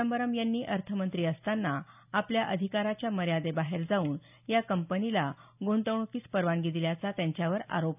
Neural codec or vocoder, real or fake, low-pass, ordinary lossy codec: none; real; 3.6 kHz; none